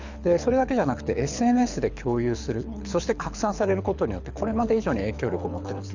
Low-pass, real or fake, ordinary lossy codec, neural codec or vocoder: 7.2 kHz; fake; none; codec, 24 kHz, 6 kbps, HILCodec